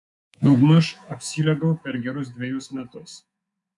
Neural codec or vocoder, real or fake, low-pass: codec, 24 kHz, 3.1 kbps, DualCodec; fake; 10.8 kHz